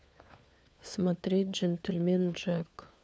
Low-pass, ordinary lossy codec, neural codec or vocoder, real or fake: none; none; codec, 16 kHz, 4 kbps, FunCodec, trained on LibriTTS, 50 frames a second; fake